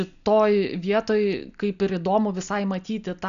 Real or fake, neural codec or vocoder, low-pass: real; none; 7.2 kHz